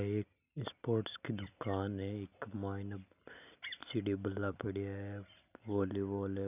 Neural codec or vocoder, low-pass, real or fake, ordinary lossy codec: none; 3.6 kHz; real; none